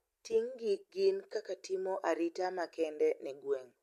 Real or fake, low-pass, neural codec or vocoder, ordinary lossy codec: real; 14.4 kHz; none; MP3, 64 kbps